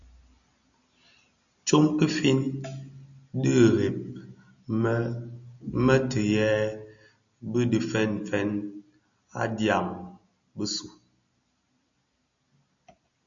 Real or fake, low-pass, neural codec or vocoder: real; 7.2 kHz; none